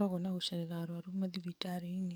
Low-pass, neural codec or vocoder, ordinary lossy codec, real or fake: none; codec, 44.1 kHz, 7.8 kbps, DAC; none; fake